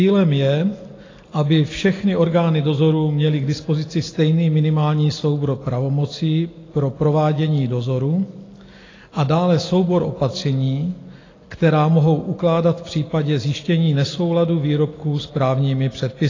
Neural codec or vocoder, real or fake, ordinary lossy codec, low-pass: none; real; AAC, 32 kbps; 7.2 kHz